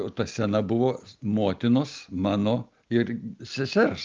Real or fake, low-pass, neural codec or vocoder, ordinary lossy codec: real; 7.2 kHz; none; Opus, 24 kbps